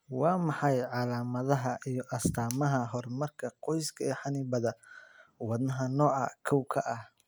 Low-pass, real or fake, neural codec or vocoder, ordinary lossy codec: none; real; none; none